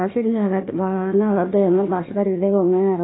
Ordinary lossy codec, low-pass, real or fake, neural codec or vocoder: AAC, 16 kbps; 7.2 kHz; fake; codec, 16 kHz, 1 kbps, FunCodec, trained on Chinese and English, 50 frames a second